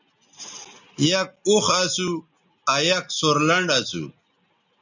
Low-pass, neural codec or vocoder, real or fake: 7.2 kHz; none; real